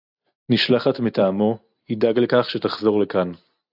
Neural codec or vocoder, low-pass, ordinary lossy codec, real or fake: none; 5.4 kHz; AAC, 48 kbps; real